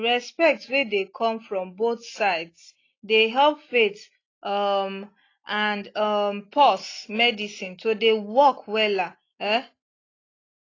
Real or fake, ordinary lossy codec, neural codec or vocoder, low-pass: real; AAC, 32 kbps; none; 7.2 kHz